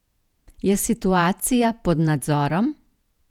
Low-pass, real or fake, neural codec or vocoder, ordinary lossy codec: 19.8 kHz; fake; vocoder, 48 kHz, 128 mel bands, Vocos; none